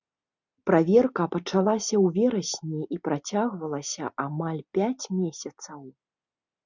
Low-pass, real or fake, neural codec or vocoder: 7.2 kHz; real; none